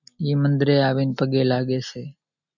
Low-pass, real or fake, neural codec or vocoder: 7.2 kHz; real; none